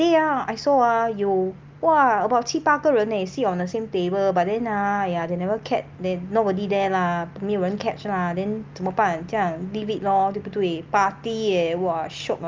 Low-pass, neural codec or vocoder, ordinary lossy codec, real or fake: 7.2 kHz; none; Opus, 32 kbps; real